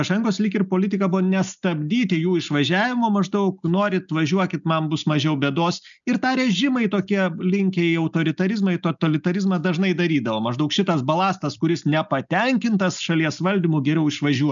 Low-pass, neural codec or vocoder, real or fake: 7.2 kHz; none; real